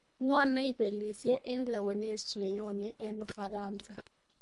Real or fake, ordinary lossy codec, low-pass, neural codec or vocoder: fake; MP3, 64 kbps; 10.8 kHz; codec, 24 kHz, 1.5 kbps, HILCodec